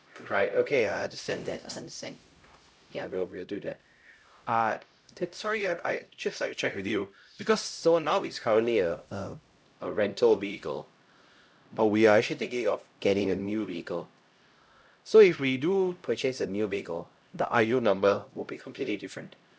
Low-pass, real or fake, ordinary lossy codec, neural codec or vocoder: none; fake; none; codec, 16 kHz, 0.5 kbps, X-Codec, HuBERT features, trained on LibriSpeech